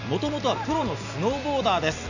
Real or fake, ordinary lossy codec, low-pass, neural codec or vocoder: real; none; 7.2 kHz; none